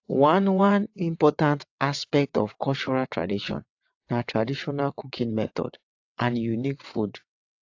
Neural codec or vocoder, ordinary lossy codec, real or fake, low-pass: vocoder, 22.05 kHz, 80 mel bands, WaveNeXt; AAC, 48 kbps; fake; 7.2 kHz